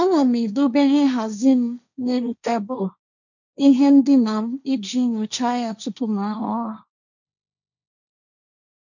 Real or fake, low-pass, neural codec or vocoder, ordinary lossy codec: fake; 7.2 kHz; codec, 16 kHz, 1.1 kbps, Voila-Tokenizer; none